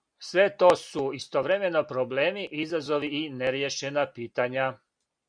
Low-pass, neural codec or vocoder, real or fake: 9.9 kHz; none; real